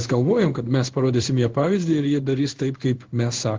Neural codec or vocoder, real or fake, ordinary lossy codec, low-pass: codec, 16 kHz in and 24 kHz out, 1 kbps, XY-Tokenizer; fake; Opus, 16 kbps; 7.2 kHz